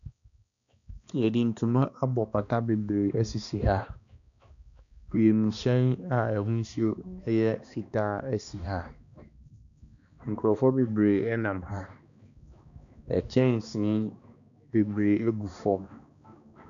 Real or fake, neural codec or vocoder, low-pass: fake; codec, 16 kHz, 2 kbps, X-Codec, HuBERT features, trained on balanced general audio; 7.2 kHz